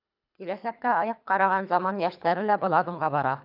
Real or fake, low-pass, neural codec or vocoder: fake; 5.4 kHz; codec, 24 kHz, 3 kbps, HILCodec